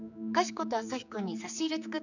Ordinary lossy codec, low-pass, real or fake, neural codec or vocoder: none; 7.2 kHz; fake; codec, 16 kHz, 4 kbps, X-Codec, HuBERT features, trained on general audio